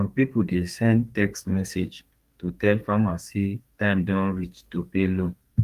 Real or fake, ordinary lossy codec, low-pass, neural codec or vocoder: fake; Opus, 32 kbps; 14.4 kHz; codec, 32 kHz, 1.9 kbps, SNAC